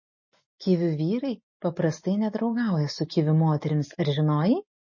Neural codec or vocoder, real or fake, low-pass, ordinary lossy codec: none; real; 7.2 kHz; MP3, 32 kbps